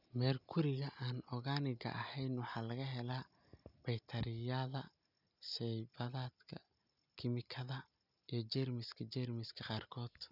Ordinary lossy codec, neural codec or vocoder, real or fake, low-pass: none; none; real; 5.4 kHz